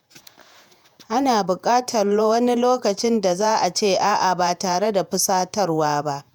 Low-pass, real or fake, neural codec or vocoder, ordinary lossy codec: none; fake; vocoder, 48 kHz, 128 mel bands, Vocos; none